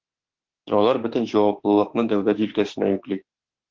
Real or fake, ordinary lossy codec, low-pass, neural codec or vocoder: fake; Opus, 16 kbps; 7.2 kHz; autoencoder, 48 kHz, 32 numbers a frame, DAC-VAE, trained on Japanese speech